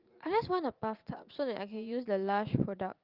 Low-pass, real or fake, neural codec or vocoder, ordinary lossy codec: 5.4 kHz; fake; vocoder, 44.1 kHz, 80 mel bands, Vocos; Opus, 32 kbps